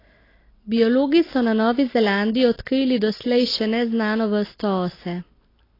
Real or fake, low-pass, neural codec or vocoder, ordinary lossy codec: real; 5.4 kHz; none; AAC, 24 kbps